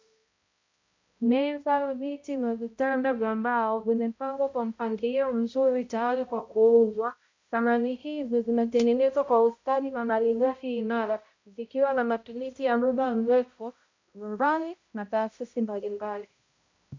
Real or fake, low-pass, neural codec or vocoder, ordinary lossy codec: fake; 7.2 kHz; codec, 16 kHz, 0.5 kbps, X-Codec, HuBERT features, trained on balanced general audio; MP3, 64 kbps